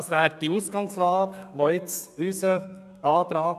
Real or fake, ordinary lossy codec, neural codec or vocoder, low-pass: fake; none; codec, 32 kHz, 1.9 kbps, SNAC; 14.4 kHz